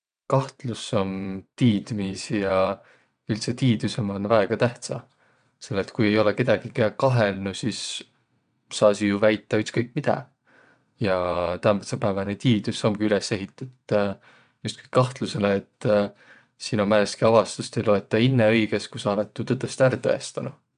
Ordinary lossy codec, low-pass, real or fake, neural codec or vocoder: none; 9.9 kHz; fake; vocoder, 22.05 kHz, 80 mel bands, WaveNeXt